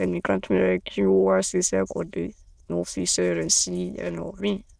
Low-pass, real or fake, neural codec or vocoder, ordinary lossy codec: none; fake; autoencoder, 22.05 kHz, a latent of 192 numbers a frame, VITS, trained on many speakers; none